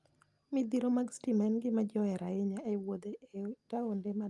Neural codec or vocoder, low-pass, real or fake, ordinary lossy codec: none; 10.8 kHz; real; Opus, 32 kbps